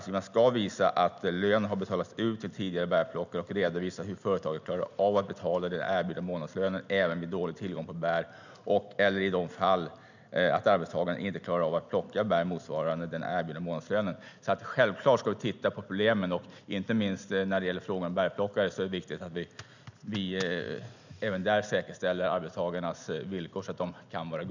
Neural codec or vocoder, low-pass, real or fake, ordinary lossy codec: none; 7.2 kHz; real; none